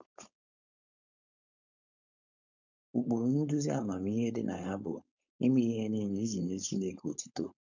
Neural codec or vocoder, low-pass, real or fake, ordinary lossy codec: codec, 16 kHz, 4.8 kbps, FACodec; 7.2 kHz; fake; none